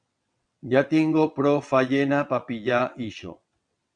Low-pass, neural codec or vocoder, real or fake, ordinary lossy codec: 9.9 kHz; vocoder, 22.05 kHz, 80 mel bands, WaveNeXt; fake; MP3, 96 kbps